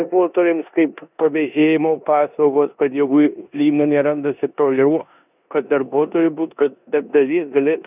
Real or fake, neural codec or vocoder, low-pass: fake; codec, 16 kHz in and 24 kHz out, 0.9 kbps, LongCat-Audio-Codec, four codebook decoder; 3.6 kHz